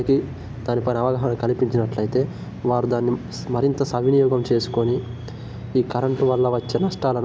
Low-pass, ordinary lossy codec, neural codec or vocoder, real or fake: none; none; none; real